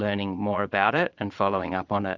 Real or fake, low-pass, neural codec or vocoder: fake; 7.2 kHz; vocoder, 22.05 kHz, 80 mel bands, Vocos